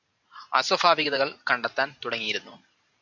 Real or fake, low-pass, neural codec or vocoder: fake; 7.2 kHz; vocoder, 44.1 kHz, 128 mel bands every 512 samples, BigVGAN v2